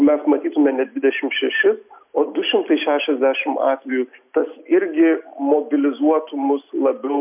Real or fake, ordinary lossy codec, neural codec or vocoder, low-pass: real; MP3, 32 kbps; none; 3.6 kHz